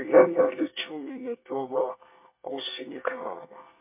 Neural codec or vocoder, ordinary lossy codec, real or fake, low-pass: codec, 24 kHz, 1 kbps, SNAC; MP3, 24 kbps; fake; 3.6 kHz